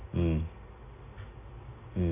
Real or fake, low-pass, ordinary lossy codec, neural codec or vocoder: real; 3.6 kHz; AAC, 16 kbps; none